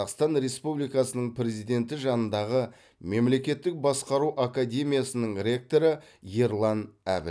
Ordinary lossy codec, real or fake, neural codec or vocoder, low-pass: none; real; none; none